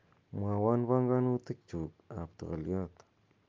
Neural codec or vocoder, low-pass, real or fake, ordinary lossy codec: none; 7.2 kHz; real; Opus, 24 kbps